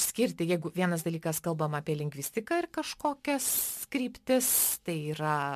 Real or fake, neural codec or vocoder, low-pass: real; none; 14.4 kHz